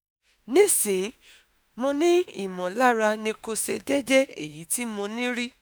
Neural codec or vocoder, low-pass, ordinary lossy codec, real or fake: autoencoder, 48 kHz, 32 numbers a frame, DAC-VAE, trained on Japanese speech; none; none; fake